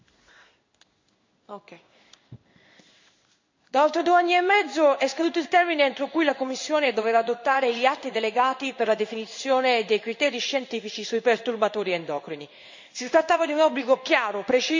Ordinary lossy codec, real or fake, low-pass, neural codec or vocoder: MP3, 64 kbps; fake; 7.2 kHz; codec, 16 kHz in and 24 kHz out, 1 kbps, XY-Tokenizer